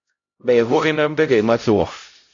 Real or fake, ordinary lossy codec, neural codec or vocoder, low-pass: fake; AAC, 48 kbps; codec, 16 kHz, 0.5 kbps, X-Codec, HuBERT features, trained on LibriSpeech; 7.2 kHz